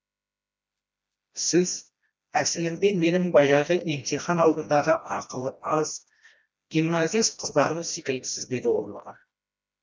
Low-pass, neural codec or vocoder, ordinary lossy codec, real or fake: none; codec, 16 kHz, 1 kbps, FreqCodec, smaller model; none; fake